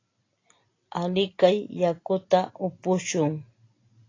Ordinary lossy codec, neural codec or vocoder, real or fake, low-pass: AAC, 32 kbps; none; real; 7.2 kHz